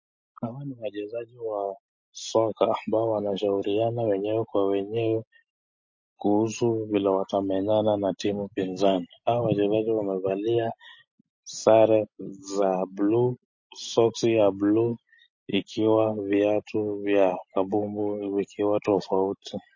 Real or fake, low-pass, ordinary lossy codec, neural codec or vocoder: real; 7.2 kHz; MP3, 32 kbps; none